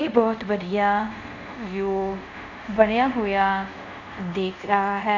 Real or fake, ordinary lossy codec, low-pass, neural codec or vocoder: fake; none; 7.2 kHz; codec, 24 kHz, 0.5 kbps, DualCodec